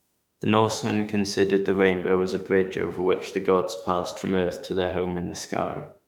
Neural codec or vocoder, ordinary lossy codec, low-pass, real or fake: autoencoder, 48 kHz, 32 numbers a frame, DAC-VAE, trained on Japanese speech; none; 19.8 kHz; fake